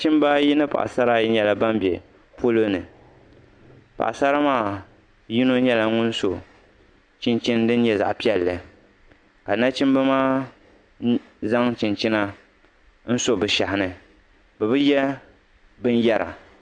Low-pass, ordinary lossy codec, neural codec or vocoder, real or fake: 9.9 kHz; Opus, 64 kbps; none; real